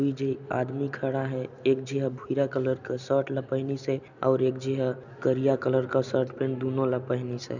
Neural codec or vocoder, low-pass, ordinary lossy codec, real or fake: none; 7.2 kHz; none; real